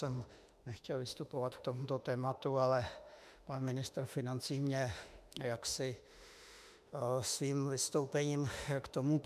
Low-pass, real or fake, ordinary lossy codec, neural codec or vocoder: 14.4 kHz; fake; MP3, 96 kbps; autoencoder, 48 kHz, 32 numbers a frame, DAC-VAE, trained on Japanese speech